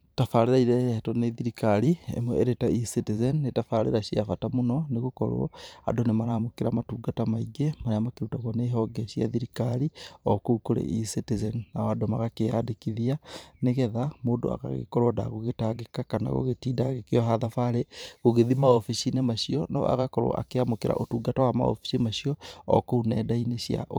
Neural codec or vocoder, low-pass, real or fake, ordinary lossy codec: vocoder, 44.1 kHz, 128 mel bands every 512 samples, BigVGAN v2; none; fake; none